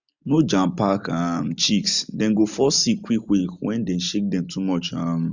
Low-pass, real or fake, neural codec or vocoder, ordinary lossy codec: 7.2 kHz; real; none; none